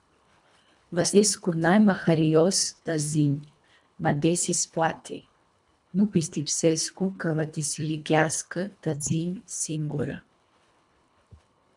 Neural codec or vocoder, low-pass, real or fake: codec, 24 kHz, 1.5 kbps, HILCodec; 10.8 kHz; fake